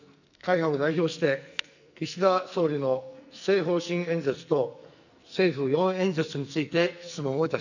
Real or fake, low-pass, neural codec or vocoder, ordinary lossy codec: fake; 7.2 kHz; codec, 44.1 kHz, 2.6 kbps, SNAC; none